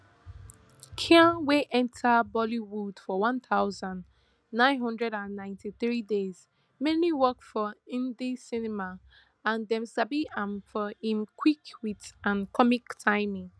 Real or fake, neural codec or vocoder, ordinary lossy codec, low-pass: real; none; none; none